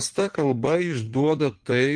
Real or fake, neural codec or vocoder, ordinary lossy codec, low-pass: fake; codec, 16 kHz in and 24 kHz out, 1.1 kbps, FireRedTTS-2 codec; Opus, 32 kbps; 9.9 kHz